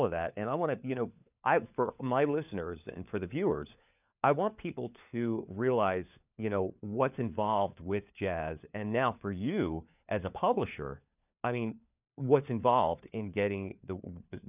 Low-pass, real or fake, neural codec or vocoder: 3.6 kHz; fake; codec, 16 kHz, 4 kbps, FunCodec, trained on LibriTTS, 50 frames a second